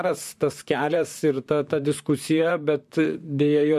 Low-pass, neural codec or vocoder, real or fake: 14.4 kHz; vocoder, 44.1 kHz, 128 mel bands, Pupu-Vocoder; fake